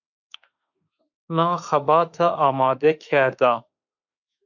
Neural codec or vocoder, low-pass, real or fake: autoencoder, 48 kHz, 32 numbers a frame, DAC-VAE, trained on Japanese speech; 7.2 kHz; fake